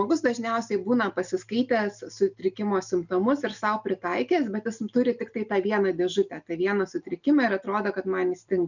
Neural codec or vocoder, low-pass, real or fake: none; 7.2 kHz; real